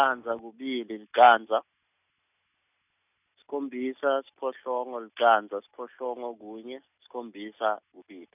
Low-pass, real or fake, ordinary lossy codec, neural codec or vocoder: 3.6 kHz; real; none; none